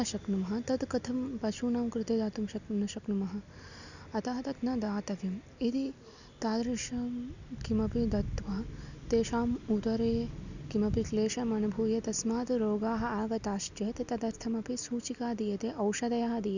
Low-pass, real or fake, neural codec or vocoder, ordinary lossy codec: 7.2 kHz; real; none; none